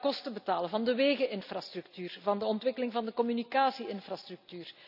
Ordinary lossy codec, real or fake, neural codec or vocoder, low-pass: none; real; none; 5.4 kHz